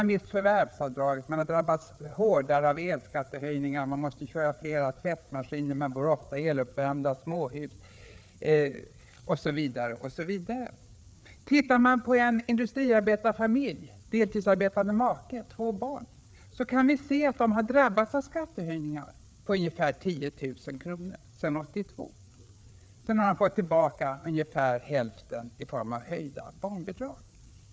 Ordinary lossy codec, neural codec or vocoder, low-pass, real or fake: none; codec, 16 kHz, 4 kbps, FreqCodec, larger model; none; fake